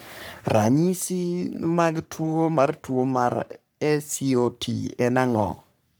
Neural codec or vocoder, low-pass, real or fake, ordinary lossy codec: codec, 44.1 kHz, 3.4 kbps, Pupu-Codec; none; fake; none